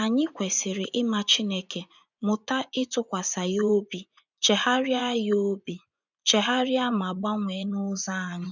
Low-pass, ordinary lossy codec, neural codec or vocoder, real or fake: 7.2 kHz; none; vocoder, 24 kHz, 100 mel bands, Vocos; fake